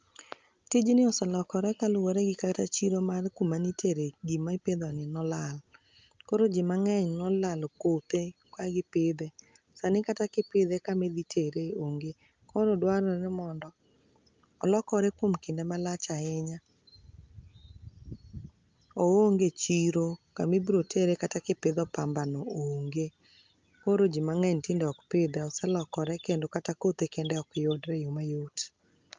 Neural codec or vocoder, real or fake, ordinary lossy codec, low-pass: none; real; Opus, 24 kbps; 7.2 kHz